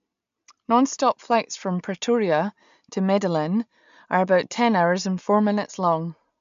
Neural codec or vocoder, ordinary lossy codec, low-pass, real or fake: none; MP3, 64 kbps; 7.2 kHz; real